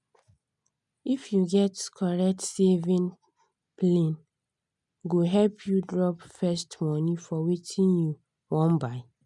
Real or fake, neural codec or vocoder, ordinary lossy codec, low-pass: real; none; none; 10.8 kHz